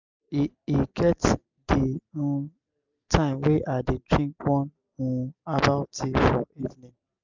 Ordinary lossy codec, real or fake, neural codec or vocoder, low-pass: none; real; none; 7.2 kHz